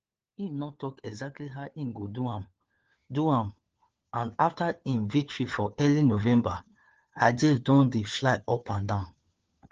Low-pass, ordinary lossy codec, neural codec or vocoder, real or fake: 7.2 kHz; Opus, 16 kbps; codec, 16 kHz, 4 kbps, FunCodec, trained on LibriTTS, 50 frames a second; fake